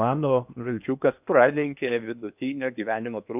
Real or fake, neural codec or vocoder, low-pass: fake; codec, 16 kHz in and 24 kHz out, 0.8 kbps, FocalCodec, streaming, 65536 codes; 3.6 kHz